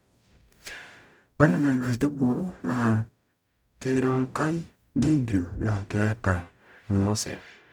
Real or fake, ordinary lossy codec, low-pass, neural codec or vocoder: fake; none; 19.8 kHz; codec, 44.1 kHz, 0.9 kbps, DAC